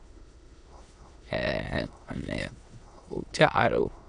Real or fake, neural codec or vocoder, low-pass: fake; autoencoder, 22.05 kHz, a latent of 192 numbers a frame, VITS, trained on many speakers; 9.9 kHz